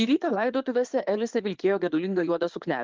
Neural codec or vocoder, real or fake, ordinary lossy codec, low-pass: codec, 16 kHz in and 24 kHz out, 2.2 kbps, FireRedTTS-2 codec; fake; Opus, 32 kbps; 7.2 kHz